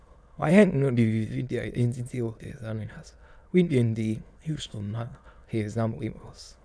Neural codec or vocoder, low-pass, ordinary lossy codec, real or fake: autoencoder, 22.05 kHz, a latent of 192 numbers a frame, VITS, trained on many speakers; none; none; fake